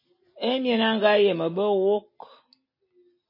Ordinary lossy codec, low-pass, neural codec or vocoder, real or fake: MP3, 24 kbps; 5.4 kHz; none; real